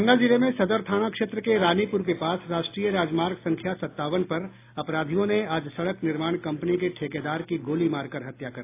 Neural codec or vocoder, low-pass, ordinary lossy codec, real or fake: none; 3.6 kHz; AAC, 24 kbps; real